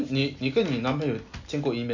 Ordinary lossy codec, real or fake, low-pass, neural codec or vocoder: none; real; 7.2 kHz; none